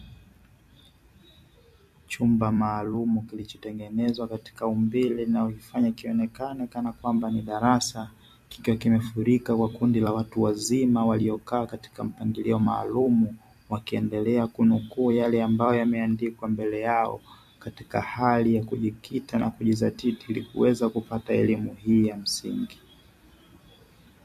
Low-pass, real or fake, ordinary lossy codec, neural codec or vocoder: 14.4 kHz; fake; MP3, 64 kbps; vocoder, 44.1 kHz, 128 mel bands every 256 samples, BigVGAN v2